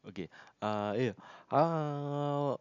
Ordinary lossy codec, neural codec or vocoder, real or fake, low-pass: none; none; real; 7.2 kHz